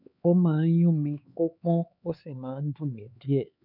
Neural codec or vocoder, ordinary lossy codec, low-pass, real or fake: codec, 16 kHz, 4 kbps, X-Codec, HuBERT features, trained on LibriSpeech; none; 5.4 kHz; fake